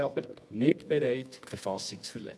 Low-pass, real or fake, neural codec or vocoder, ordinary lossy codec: none; fake; codec, 24 kHz, 0.9 kbps, WavTokenizer, medium music audio release; none